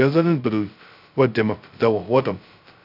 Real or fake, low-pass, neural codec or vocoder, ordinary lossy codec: fake; 5.4 kHz; codec, 16 kHz, 0.2 kbps, FocalCodec; none